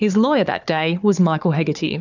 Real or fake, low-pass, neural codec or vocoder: fake; 7.2 kHz; codec, 16 kHz, 4 kbps, FunCodec, trained on Chinese and English, 50 frames a second